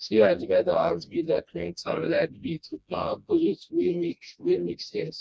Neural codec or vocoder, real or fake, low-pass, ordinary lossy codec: codec, 16 kHz, 1 kbps, FreqCodec, smaller model; fake; none; none